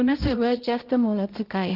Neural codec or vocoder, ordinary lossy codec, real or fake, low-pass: codec, 16 kHz, 0.5 kbps, X-Codec, HuBERT features, trained on balanced general audio; Opus, 16 kbps; fake; 5.4 kHz